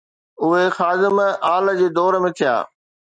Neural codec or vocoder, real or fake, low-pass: none; real; 9.9 kHz